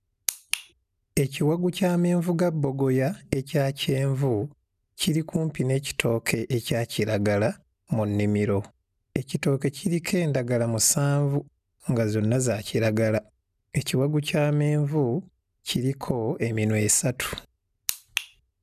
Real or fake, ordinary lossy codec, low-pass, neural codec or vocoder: real; none; 14.4 kHz; none